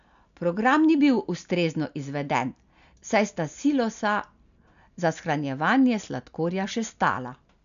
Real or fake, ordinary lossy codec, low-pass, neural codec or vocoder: real; none; 7.2 kHz; none